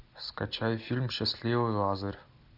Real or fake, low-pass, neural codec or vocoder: real; 5.4 kHz; none